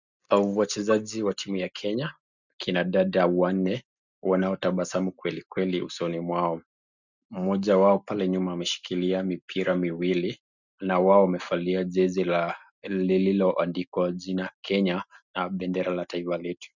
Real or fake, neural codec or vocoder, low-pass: real; none; 7.2 kHz